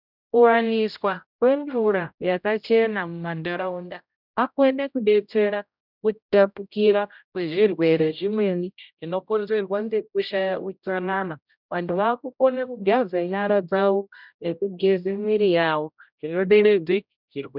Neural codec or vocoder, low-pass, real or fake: codec, 16 kHz, 0.5 kbps, X-Codec, HuBERT features, trained on general audio; 5.4 kHz; fake